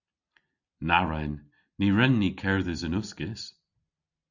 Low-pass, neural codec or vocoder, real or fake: 7.2 kHz; none; real